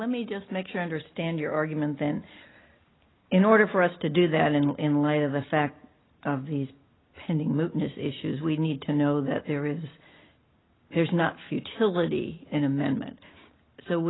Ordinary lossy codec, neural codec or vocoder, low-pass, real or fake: AAC, 16 kbps; none; 7.2 kHz; real